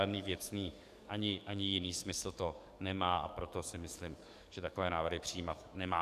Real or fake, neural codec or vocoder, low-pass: fake; codec, 44.1 kHz, 7.8 kbps, DAC; 14.4 kHz